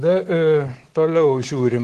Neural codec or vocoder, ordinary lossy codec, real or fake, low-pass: codec, 24 kHz, 3.1 kbps, DualCodec; Opus, 16 kbps; fake; 10.8 kHz